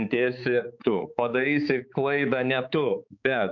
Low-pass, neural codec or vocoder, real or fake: 7.2 kHz; codec, 16 kHz, 4 kbps, X-Codec, HuBERT features, trained on balanced general audio; fake